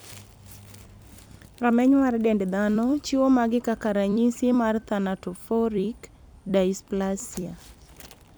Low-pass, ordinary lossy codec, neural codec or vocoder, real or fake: none; none; vocoder, 44.1 kHz, 128 mel bands every 256 samples, BigVGAN v2; fake